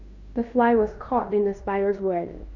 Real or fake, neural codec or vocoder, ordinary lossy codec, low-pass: fake; codec, 16 kHz in and 24 kHz out, 0.9 kbps, LongCat-Audio-Codec, fine tuned four codebook decoder; none; 7.2 kHz